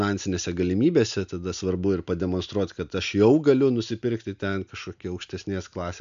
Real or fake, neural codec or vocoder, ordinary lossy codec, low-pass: real; none; AAC, 96 kbps; 7.2 kHz